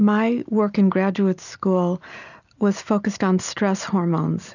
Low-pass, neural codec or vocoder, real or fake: 7.2 kHz; none; real